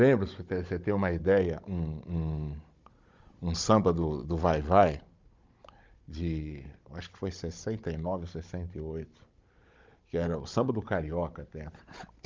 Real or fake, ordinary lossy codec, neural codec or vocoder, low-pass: fake; Opus, 32 kbps; codec, 16 kHz, 16 kbps, FunCodec, trained on Chinese and English, 50 frames a second; 7.2 kHz